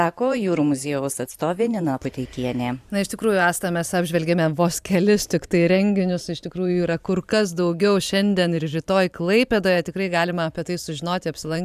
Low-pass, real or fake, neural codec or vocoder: 14.4 kHz; fake; vocoder, 44.1 kHz, 128 mel bands every 512 samples, BigVGAN v2